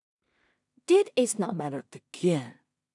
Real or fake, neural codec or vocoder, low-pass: fake; codec, 16 kHz in and 24 kHz out, 0.4 kbps, LongCat-Audio-Codec, two codebook decoder; 10.8 kHz